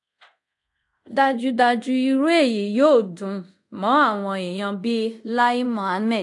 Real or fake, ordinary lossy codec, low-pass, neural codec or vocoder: fake; AAC, 64 kbps; 10.8 kHz; codec, 24 kHz, 0.5 kbps, DualCodec